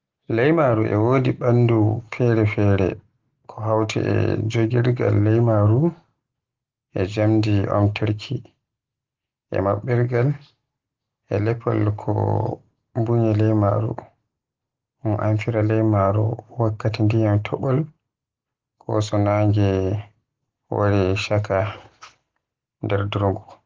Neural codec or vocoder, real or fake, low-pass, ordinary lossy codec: none; real; 7.2 kHz; Opus, 16 kbps